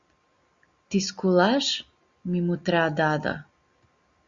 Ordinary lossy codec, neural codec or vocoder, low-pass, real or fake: Opus, 64 kbps; none; 7.2 kHz; real